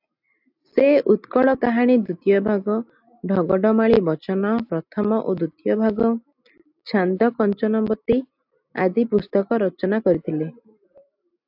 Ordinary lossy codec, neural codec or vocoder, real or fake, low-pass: AAC, 48 kbps; none; real; 5.4 kHz